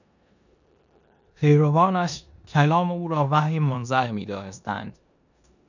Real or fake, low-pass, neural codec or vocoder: fake; 7.2 kHz; codec, 16 kHz in and 24 kHz out, 0.9 kbps, LongCat-Audio-Codec, four codebook decoder